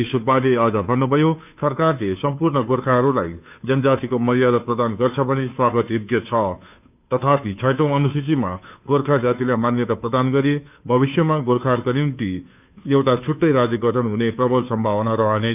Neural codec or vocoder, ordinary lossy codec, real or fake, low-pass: codec, 16 kHz, 2 kbps, FunCodec, trained on Chinese and English, 25 frames a second; none; fake; 3.6 kHz